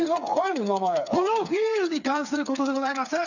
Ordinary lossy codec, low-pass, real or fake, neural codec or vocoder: none; 7.2 kHz; fake; codec, 16 kHz, 4 kbps, FreqCodec, smaller model